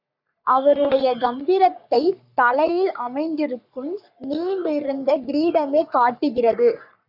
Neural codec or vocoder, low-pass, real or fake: codec, 44.1 kHz, 3.4 kbps, Pupu-Codec; 5.4 kHz; fake